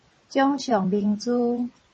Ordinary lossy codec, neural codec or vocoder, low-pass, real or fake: MP3, 32 kbps; vocoder, 22.05 kHz, 80 mel bands, WaveNeXt; 9.9 kHz; fake